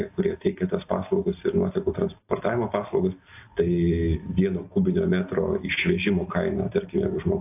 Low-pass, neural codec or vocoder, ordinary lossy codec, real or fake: 3.6 kHz; none; AAC, 32 kbps; real